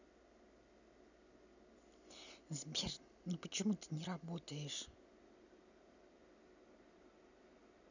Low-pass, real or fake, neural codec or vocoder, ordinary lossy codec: 7.2 kHz; real; none; none